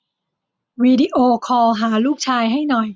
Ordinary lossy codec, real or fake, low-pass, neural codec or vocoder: none; real; none; none